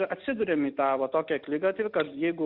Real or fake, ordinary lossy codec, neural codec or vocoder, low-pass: real; Opus, 64 kbps; none; 5.4 kHz